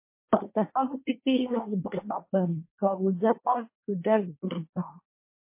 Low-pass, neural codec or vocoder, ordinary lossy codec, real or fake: 3.6 kHz; codec, 24 kHz, 1 kbps, SNAC; MP3, 24 kbps; fake